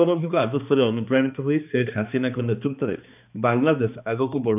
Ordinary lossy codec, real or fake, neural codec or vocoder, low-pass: none; fake; codec, 16 kHz, 2 kbps, X-Codec, HuBERT features, trained on balanced general audio; 3.6 kHz